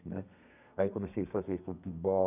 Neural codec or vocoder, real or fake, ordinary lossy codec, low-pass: codec, 32 kHz, 1.9 kbps, SNAC; fake; none; 3.6 kHz